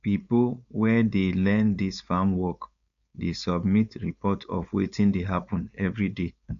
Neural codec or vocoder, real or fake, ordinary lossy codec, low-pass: codec, 16 kHz, 4.8 kbps, FACodec; fake; MP3, 96 kbps; 7.2 kHz